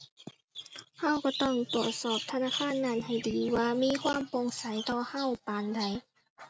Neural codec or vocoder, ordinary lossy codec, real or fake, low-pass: none; none; real; none